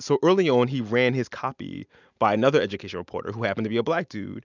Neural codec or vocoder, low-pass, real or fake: none; 7.2 kHz; real